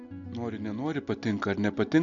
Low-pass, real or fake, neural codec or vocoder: 7.2 kHz; real; none